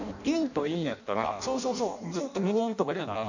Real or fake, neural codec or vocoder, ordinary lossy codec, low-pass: fake; codec, 16 kHz in and 24 kHz out, 0.6 kbps, FireRedTTS-2 codec; none; 7.2 kHz